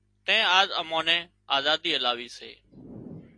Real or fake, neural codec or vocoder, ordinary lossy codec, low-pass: real; none; AAC, 48 kbps; 9.9 kHz